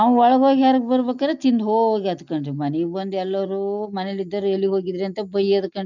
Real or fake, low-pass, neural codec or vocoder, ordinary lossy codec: real; 7.2 kHz; none; none